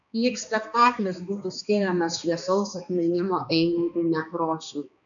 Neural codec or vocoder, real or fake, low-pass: codec, 16 kHz, 2 kbps, X-Codec, HuBERT features, trained on balanced general audio; fake; 7.2 kHz